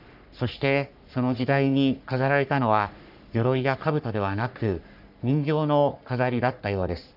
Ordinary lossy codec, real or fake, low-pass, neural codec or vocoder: none; fake; 5.4 kHz; codec, 44.1 kHz, 3.4 kbps, Pupu-Codec